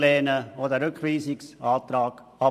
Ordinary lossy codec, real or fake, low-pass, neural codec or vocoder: none; fake; 14.4 kHz; vocoder, 44.1 kHz, 128 mel bands every 512 samples, BigVGAN v2